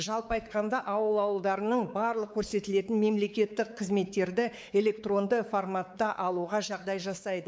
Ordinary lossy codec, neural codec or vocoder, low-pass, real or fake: none; codec, 16 kHz, 4 kbps, X-Codec, WavLM features, trained on Multilingual LibriSpeech; none; fake